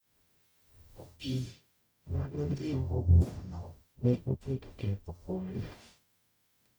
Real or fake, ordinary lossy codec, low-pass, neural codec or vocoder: fake; none; none; codec, 44.1 kHz, 0.9 kbps, DAC